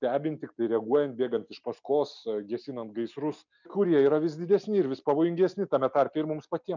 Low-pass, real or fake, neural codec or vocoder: 7.2 kHz; real; none